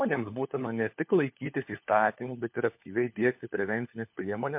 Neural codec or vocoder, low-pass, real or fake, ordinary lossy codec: codec, 16 kHz, 4 kbps, FunCodec, trained on LibriTTS, 50 frames a second; 3.6 kHz; fake; MP3, 32 kbps